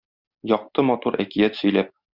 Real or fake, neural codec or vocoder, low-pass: real; none; 5.4 kHz